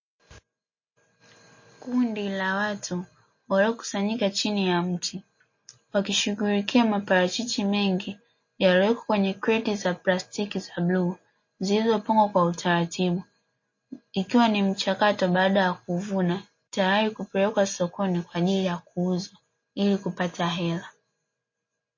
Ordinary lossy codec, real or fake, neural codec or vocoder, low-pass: MP3, 32 kbps; real; none; 7.2 kHz